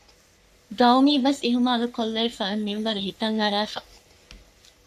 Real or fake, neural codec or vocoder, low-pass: fake; codec, 44.1 kHz, 3.4 kbps, Pupu-Codec; 14.4 kHz